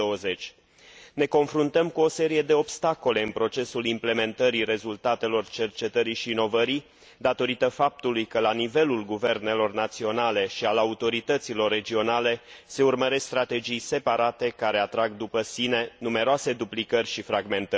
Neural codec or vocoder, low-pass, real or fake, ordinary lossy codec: none; none; real; none